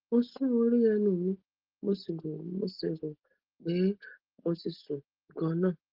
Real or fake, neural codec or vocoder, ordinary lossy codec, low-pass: real; none; Opus, 16 kbps; 5.4 kHz